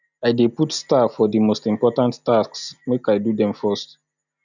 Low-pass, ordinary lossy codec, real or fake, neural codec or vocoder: 7.2 kHz; none; real; none